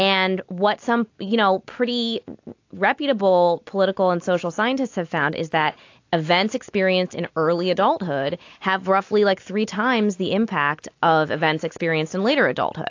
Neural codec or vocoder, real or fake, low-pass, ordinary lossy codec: none; real; 7.2 kHz; AAC, 48 kbps